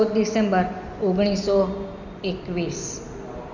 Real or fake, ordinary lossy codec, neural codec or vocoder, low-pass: real; none; none; 7.2 kHz